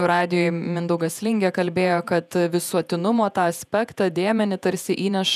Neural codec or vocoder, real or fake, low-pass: vocoder, 48 kHz, 128 mel bands, Vocos; fake; 14.4 kHz